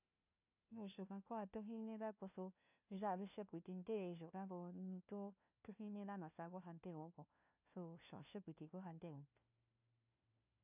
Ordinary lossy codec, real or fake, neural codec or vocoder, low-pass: none; fake; codec, 16 kHz, 1 kbps, FunCodec, trained on LibriTTS, 50 frames a second; 3.6 kHz